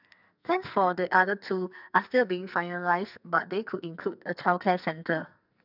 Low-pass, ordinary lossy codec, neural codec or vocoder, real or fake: 5.4 kHz; none; codec, 44.1 kHz, 2.6 kbps, SNAC; fake